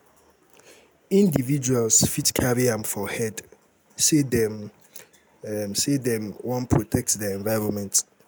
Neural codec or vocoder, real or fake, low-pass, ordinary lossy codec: none; real; none; none